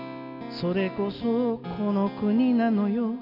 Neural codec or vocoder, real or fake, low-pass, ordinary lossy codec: none; real; 5.4 kHz; none